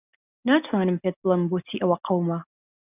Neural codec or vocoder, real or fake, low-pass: none; real; 3.6 kHz